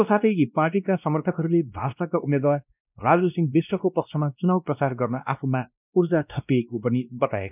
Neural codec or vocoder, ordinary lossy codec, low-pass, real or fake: codec, 16 kHz, 1 kbps, X-Codec, WavLM features, trained on Multilingual LibriSpeech; none; 3.6 kHz; fake